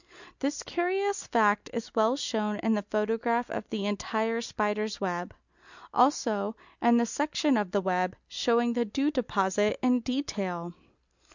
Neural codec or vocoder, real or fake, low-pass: none; real; 7.2 kHz